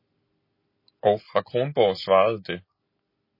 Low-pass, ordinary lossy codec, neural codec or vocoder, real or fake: 5.4 kHz; MP3, 24 kbps; none; real